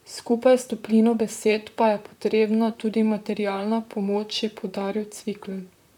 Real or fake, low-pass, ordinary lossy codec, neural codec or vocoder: fake; 19.8 kHz; none; vocoder, 44.1 kHz, 128 mel bands, Pupu-Vocoder